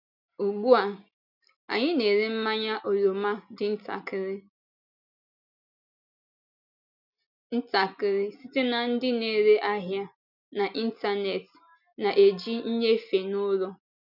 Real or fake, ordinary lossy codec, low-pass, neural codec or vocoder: real; none; 5.4 kHz; none